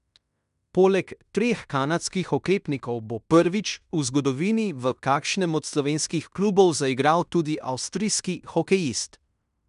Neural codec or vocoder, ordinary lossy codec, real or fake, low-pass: codec, 16 kHz in and 24 kHz out, 0.9 kbps, LongCat-Audio-Codec, fine tuned four codebook decoder; none; fake; 10.8 kHz